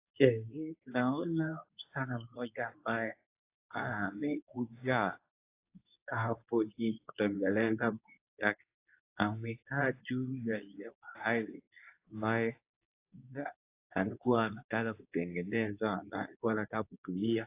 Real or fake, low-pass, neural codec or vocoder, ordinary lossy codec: fake; 3.6 kHz; codec, 24 kHz, 0.9 kbps, WavTokenizer, medium speech release version 2; AAC, 24 kbps